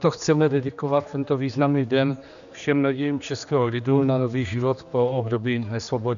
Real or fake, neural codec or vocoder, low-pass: fake; codec, 16 kHz, 2 kbps, X-Codec, HuBERT features, trained on general audio; 7.2 kHz